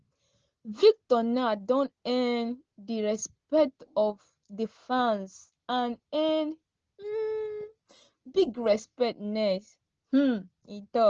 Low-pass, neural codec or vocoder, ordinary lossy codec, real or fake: 7.2 kHz; none; Opus, 16 kbps; real